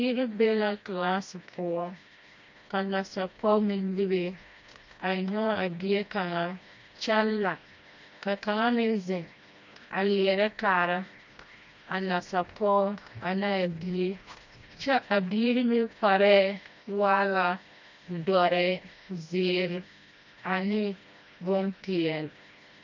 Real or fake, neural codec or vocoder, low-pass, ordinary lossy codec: fake; codec, 16 kHz, 1 kbps, FreqCodec, smaller model; 7.2 kHz; MP3, 48 kbps